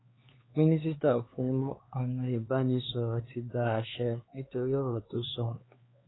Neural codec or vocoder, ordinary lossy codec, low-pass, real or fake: codec, 16 kHz, 4 kbps, X-Codec, HuBERT features, trained on LibriSpeech; AAC, 16 kbps; 7.2 kHz; fake